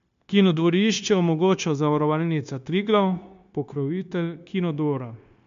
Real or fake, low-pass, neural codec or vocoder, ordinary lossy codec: fake; 7.2 kHz; codec, 16 kHz, 0.9 kbps, LongCat-Audio-Codec; MP3, 64 kbps